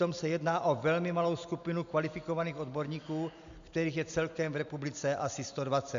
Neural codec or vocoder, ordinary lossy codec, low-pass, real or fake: none; MP3, 64 kbps; 7.2 kHz; real